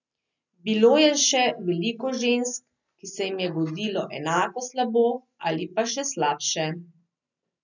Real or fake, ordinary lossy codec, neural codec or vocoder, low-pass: real; none; none; 7.2 kHz